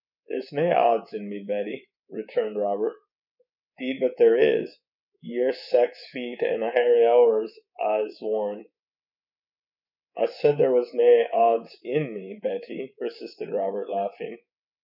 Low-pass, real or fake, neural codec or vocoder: 5.4 kHz; fake; codec, 24 kHz, 3.1 kbps, DualCodec